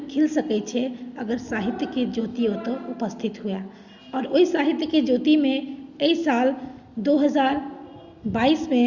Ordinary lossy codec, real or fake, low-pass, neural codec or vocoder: Opus, 64 kbps; real; 7.2 kHz; none